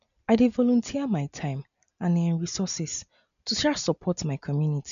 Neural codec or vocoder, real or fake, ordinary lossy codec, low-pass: none; real; none; 7.2 kHz